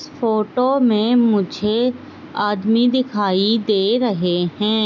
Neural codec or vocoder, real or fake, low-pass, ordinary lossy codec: none; real; 7.2 kHz; none